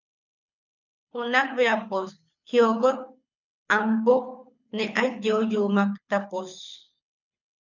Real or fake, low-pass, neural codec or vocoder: fake; 7.2 kHz; codec, 24 kHz, 6 kbps, HILCodec